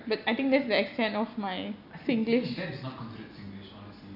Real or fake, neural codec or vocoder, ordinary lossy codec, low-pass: real; none; none; 5.4 kHz